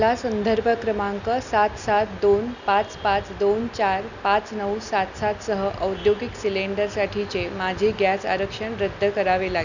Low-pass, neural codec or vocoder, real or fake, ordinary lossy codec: 7.2 kHz; none; real; none